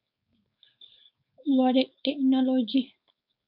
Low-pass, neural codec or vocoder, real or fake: 5.4 kHz; codec, 16 kHz, 4.8 kbps, FACodec; fake